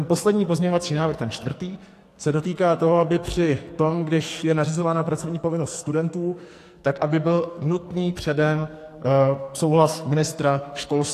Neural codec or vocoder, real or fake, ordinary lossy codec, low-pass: codec, 44.1 kHz, 2.6 kbps, SNAC; fake; AAC, 64 kbps; 14.4 kHz